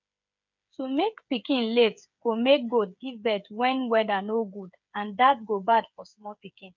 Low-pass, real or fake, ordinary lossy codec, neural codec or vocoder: 7.2 kHz; fake; none; codec, 16 kHz, 8 kbps, FreqCodec, smaller model